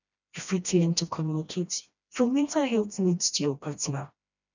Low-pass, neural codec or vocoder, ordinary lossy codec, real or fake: 7.2 kHz; codec, 16 kHz, 1 kbps, FreqCodec, smaller model; none; fake